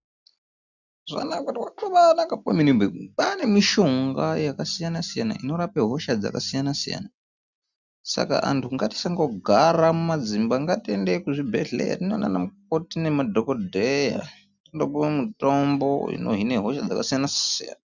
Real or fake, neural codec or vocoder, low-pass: real; none; 7.2 kHz